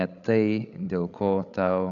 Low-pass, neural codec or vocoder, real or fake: 7.2 kHz; codec, 16 kHz, 16 kbps, FreqCodec, larger model; fake